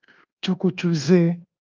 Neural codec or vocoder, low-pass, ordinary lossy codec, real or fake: codec, 24 kHz, 1.2 kbps, DualCodec; 7.2 kHz; Opus, 32 kbps; fake